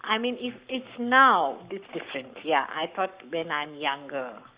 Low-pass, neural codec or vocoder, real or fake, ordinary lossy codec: 3.6 kHz; codec, 44.1 kHz, 7.8 kbps, Pupu-Codec; fake; Opus, 64 kbps